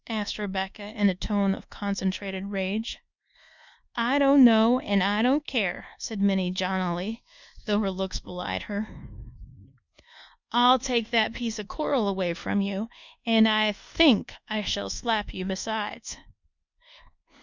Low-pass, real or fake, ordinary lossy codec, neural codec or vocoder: 7.2 kHz; fake; Opus, 64 kbps; codec, 24 kHz, 1.2 kbps, DualCodec